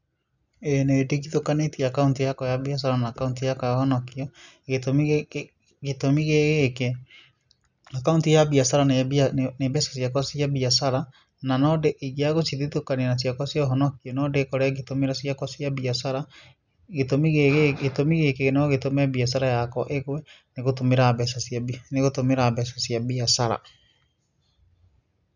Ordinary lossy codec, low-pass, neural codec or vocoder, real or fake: none; 7.2 kHz; none; real